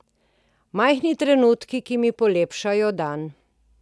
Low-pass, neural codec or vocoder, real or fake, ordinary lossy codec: none; none; real; none